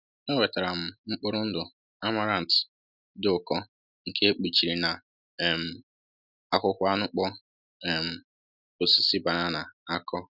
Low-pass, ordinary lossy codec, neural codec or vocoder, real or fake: 5.4 kHz; none; none; real